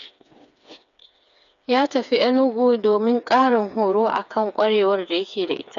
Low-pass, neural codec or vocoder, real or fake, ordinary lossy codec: 7.2 kHz; codec, 16 kHz, 4 kbps, FreqCodec, smaller model; fake; none